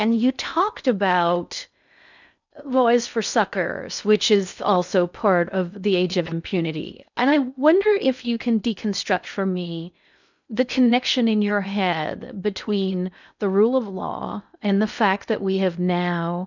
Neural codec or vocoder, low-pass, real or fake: codec, 16 kHz in and 24 kHz out, 0.6 kbps, FocalCodec, streaming, 4096 codes; 7.2 kHz; fake